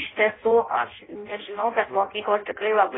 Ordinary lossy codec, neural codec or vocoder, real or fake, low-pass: AAC, 16 kbps; codec, 16 kHz in and 24 kHz out, 0.6 kbps, FireRedTTS-2 codec; fake; 7.2 kHz